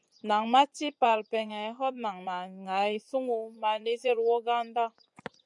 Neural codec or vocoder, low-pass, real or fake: vocoder, 24 kHz, 100 mel bands, Vocos; 10.8 kHz; fake